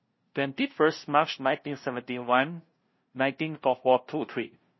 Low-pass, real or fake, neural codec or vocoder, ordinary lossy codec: 7.2 kHz; fake; codec, 16 kHz, 0.5 kbps, FunCodec, trained on LibriTTS, 25 frames a second; MP3, 24 kbps